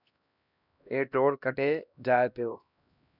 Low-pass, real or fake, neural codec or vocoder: 5.4 kHz; fake; codec, 16 kHz, 1 kbps, X-Codec, HuBERT features, trained on LibriSpeech